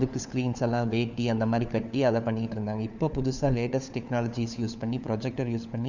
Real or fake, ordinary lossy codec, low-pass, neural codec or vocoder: fake; none; 7.2 kHz; codec, 16 kHz, 4 kbps, FunCodec, trained on LibriTTS, 50 frames a second